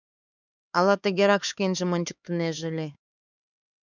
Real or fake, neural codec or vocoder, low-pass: fake; codec, 16 kHz, 4 kbps, X-Codec, HuBERT features, trained on LibriSpeech; 7.2 kHz